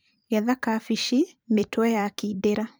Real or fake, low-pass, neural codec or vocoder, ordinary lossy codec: fake; none; vocoder, 44.1 kHz, 128 mel bands every 512 samples, BigVGAN v2; none